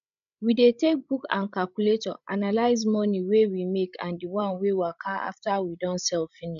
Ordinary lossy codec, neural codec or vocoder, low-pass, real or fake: none; codec, 16 kHz, 16 kbps, FreqCodec, larger model; 7.2 kHz; fake